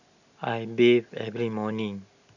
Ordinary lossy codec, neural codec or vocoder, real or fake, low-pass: none; none; real; 7.2 kHz